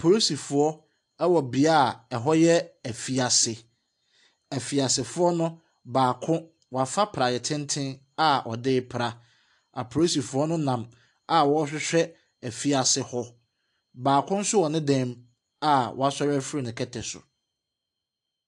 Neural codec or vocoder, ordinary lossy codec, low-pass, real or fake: none; AAC, 64 kbps; 10.8 kHz; real